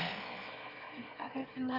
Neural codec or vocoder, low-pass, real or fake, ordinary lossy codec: autoencoder, 22.05 kHz, a latent of 192 numbers a frame, VITS, trained on one speaker; 5.4 kHz; fake; none